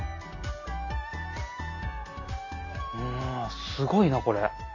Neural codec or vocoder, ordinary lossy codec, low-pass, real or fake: none; none; 7.2 kHz; real